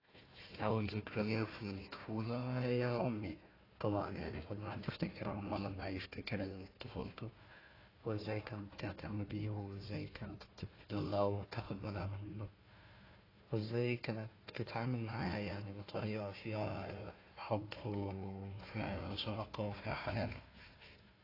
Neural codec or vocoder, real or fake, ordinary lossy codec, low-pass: codec, 16 kHz, 1 kbps, FunCodec, trained on Chinese and English, 50 frames a second; fake; AAC, 24 kbps; 5.4 kHz